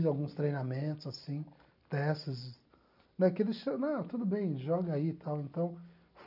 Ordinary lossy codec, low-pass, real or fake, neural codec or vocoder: none; 5.4 kHz; real; none